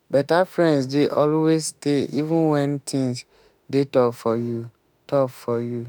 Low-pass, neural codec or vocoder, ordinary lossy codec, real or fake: none; autoencoder, 48 kHz, 32 numbers a frame, DAC-VAE, trained on Japanese speech; none; fake